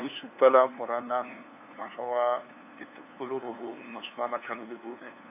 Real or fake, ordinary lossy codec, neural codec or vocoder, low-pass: fake; none; codec, 16 kHz, 2 kbps, FunCodec, trained on LibriTTS, 25 frames a second; 3.6 kHz